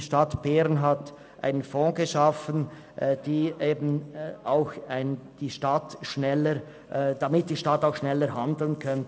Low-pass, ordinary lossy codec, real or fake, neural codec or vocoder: none; none; real; none